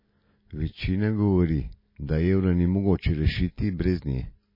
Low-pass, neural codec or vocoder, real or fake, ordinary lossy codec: 5.4 kHz; none; real; MP3, 24 kbps